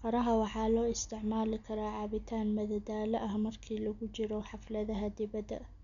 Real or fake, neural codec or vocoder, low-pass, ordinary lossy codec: real; none; 7.2 kHz; none